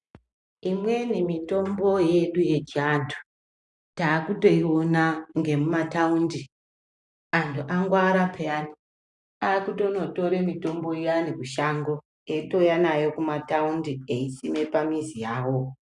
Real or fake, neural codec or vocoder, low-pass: real; none; 10.8 kHz